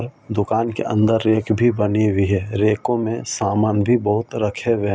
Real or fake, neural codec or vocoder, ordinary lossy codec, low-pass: real; none; none; none